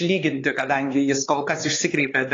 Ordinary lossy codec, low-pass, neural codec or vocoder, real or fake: AAC, 32 kbps; 7.2 kHz; codec, 16 kHz, 4 kbps, X-Codec, HuBERT features, trained on LibriSpeech; fake